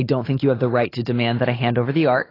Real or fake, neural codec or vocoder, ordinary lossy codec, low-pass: real; none; AAC, 24 kbps; 5.4 kHz